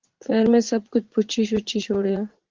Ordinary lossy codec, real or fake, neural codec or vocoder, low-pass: Opus, 16 kbps; fake; vocoder, 44.1 kHz, 80 mel bands, Vocos; 7.2 kHz